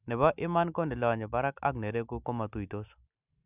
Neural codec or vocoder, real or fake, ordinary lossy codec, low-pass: none; real; none; 3.6 kHz